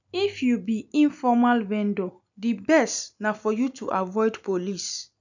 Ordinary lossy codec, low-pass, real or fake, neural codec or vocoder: none; 7.2 kHz; real; none